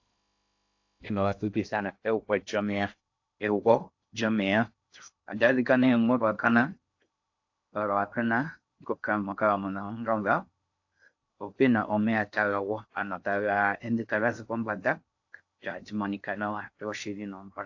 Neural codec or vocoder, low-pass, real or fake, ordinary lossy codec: codec, 16 kHz in and 24 kHz out, 0.6 kbps, FocalCodec, streaming, 2048 codes; 7.2 kHz; fake; AAC, 48 kbps